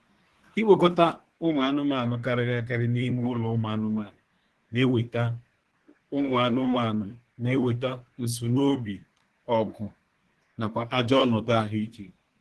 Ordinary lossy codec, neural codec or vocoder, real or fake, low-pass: Opus, 16 kbps; codec, 24 kHz, 1 kbps, SNAC; fake; 10.8 kHz